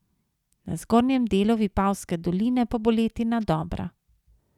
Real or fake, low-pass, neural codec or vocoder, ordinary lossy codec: real; 19.8 kHz; none; none